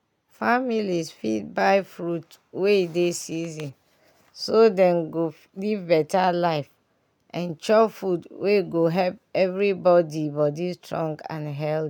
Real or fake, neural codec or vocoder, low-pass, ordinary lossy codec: real; none; 19.8 kHz; none